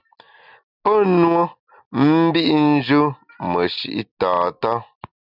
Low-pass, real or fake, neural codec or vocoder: 5.4 kHz; real; none